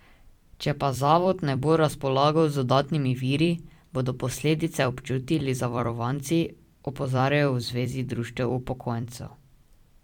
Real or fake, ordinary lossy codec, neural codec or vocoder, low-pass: fake; MP3, 96 kbps; vocoder, 44.1 kHz, 128 mel bands every 256 samples, BigVGAN v2; 19.8 kHz